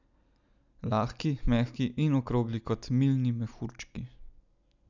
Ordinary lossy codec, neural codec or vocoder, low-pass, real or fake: none; none; 7.2 kHz; real